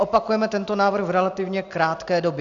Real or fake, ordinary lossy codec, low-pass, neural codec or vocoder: real; Opus, 64 kbps; 7.2 kHz; none